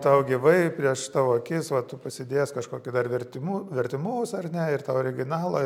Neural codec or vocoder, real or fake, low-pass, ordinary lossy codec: none; real; 19.8 kHz; MP3, 96 kbps